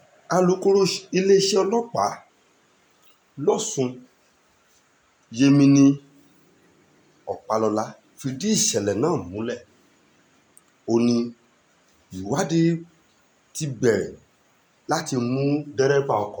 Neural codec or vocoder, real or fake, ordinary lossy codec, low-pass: none; real; none; none